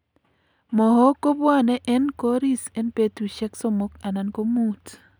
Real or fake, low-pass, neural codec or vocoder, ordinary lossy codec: real; none; none; none